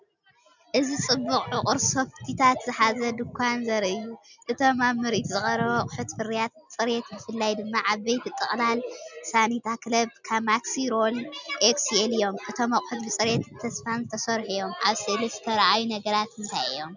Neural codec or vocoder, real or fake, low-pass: none; real; 7.2 kHz